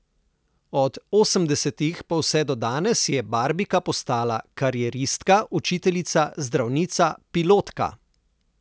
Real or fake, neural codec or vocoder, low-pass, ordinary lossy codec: real; none; none; none